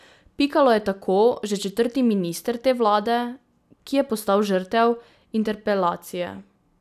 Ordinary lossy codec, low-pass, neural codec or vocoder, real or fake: none; 14.4 kHz; none; real